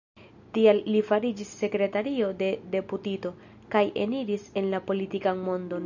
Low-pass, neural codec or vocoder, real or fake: 7.2 kHz; none; real